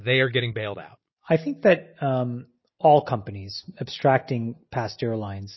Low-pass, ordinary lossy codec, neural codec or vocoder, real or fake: 7.2 kHz; MP3, 24 kbps; none; real